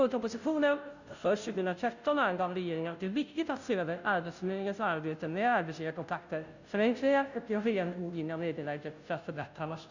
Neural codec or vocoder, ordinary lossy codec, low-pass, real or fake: codec, 16 kHz, 0.5 kbps, FunCodec, trained on Chinese and English, 25 frames a second; none; 7.2 kHz; fake